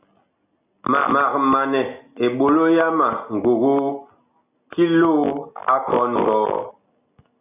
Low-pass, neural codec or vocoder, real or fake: 3.6 kHz; none; real